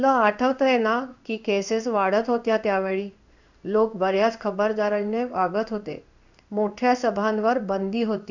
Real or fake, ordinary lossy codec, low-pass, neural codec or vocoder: fake; none; 7.2 kHz; codec, 16 kHz in and 24 kHz out, 1 kbps, XY-Tokenizer